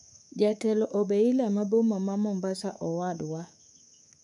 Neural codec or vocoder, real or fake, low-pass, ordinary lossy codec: codec, 24 kHz, 3.1 kbps, DualCodec; fake; 10.8 kHz; none